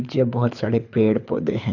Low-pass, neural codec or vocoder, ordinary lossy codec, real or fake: 7.2 kHz; codec, 24 kHz, 6 kbps, HILCodec; none; fake